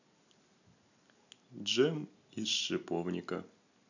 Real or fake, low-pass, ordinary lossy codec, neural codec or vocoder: real; 7.2 kHz; none; none